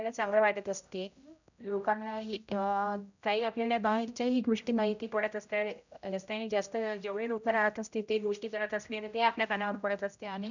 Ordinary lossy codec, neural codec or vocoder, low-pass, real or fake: none; codec, 16 kHz, 0.5 kbps, X-Codec, HuBERT features, trained on general audio; 7.2 kHz; fake